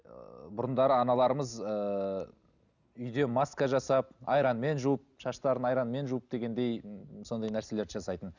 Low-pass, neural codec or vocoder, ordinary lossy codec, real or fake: 7.2 kHz; none; none; real